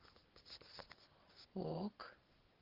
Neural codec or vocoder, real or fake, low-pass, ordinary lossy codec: none; real; 5.4 kHz; Opus, 16 kbps